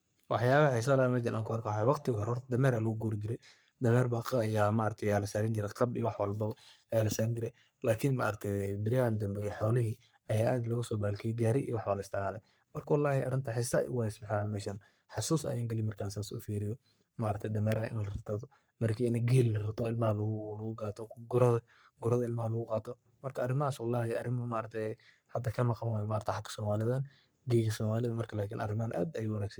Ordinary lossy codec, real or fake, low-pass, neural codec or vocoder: none; fake; none; codec, 44.1 kHz, 3.4 kbps, Pupu-Codec